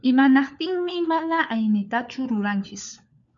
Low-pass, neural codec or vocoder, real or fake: 7.2 kHz; codec, 16 kHz, 4 kbps, FunCodec, trained on LibriTTS, 50 frames a second; fake